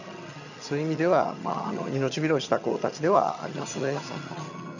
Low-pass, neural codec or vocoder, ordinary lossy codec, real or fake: 7.2 kHz; vocoder, 22.05 kHz, 80 mel bands, HiFi-GAN; none; fake